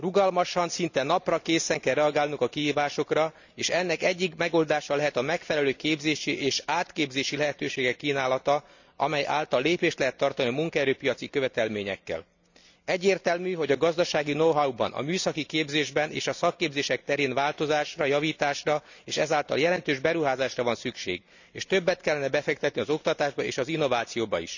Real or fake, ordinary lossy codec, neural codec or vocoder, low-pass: real; none; none; 7.2 kHz